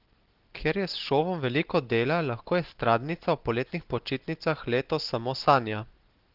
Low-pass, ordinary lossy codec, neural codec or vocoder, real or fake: 5.4 kHz; Opus, 16 kbps; none; real